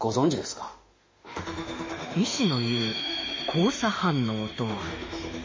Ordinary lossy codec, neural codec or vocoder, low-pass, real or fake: MP3, 32 kbps; autoencoder, 48 kHz, 32 numbers a frame, DAC-VAE, trained on Japanese speech; 7.2 kHz; fake